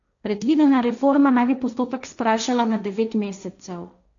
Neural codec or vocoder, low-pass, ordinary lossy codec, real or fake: codec, 16 kHz, 1.1 kbps, Voila-Tokenizer; 7.2 kHz; none; fake